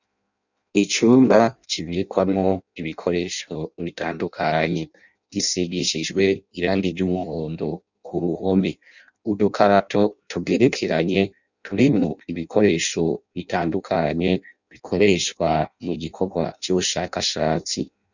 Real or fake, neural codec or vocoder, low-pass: fake; codec, 16 kHz in and 24 kHz out, 0.6 kbps, FireRedTTS-2 codec; 7.2 kHz